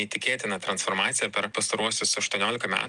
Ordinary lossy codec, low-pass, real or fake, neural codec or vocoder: Opus, 32 kbps; 10.8 kHz; real; none